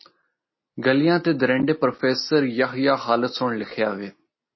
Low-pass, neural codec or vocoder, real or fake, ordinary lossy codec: 7.2 kHz; none; real; MP3, 24 kbps